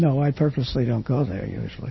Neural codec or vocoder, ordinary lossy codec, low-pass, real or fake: none; MP3, 24 kbps; 7.2 kHz; real